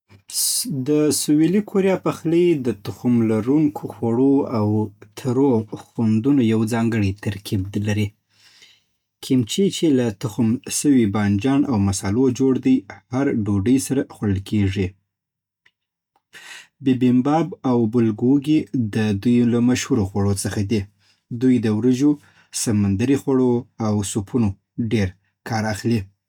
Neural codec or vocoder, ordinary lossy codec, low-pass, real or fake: none; none; 19.8 kHz; real